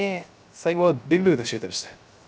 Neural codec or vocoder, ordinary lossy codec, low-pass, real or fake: codec, 16 kHz, 0.3 kbps, FocalCodec; none; none; fake